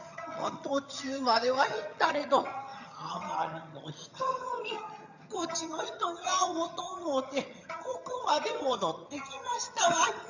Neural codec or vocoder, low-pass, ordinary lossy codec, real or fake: vocoder, 22.05 kHz, 80 mel bands, HiFi-GAN; 7.2 kHz; none; fake